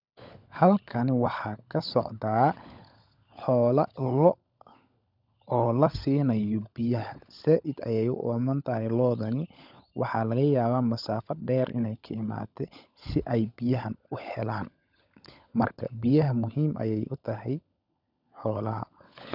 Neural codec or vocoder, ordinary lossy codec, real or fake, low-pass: codec, 16 kHz, 16 kbps, FunCodec, trained on LibriTTS, 50 frames a second; none; fake; 5.4 kHz